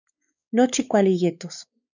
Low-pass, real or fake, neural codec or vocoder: 7.2 kHz; fake; codec, 16 kHz, 4 kbps, X-Codec, WavLM features, trained on Multilingual LibriSpeech